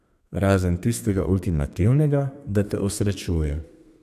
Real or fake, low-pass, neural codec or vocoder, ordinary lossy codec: fake; 14.4 kHz; codec, 32 kHz, 1.9 kbps, SNAC; none